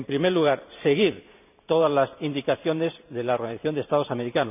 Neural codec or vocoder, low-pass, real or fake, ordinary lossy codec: vocoder, 44.1 kHz, 128 mel bands every 512 samples, BigVGAN v2; 3.6 kHz; fake; none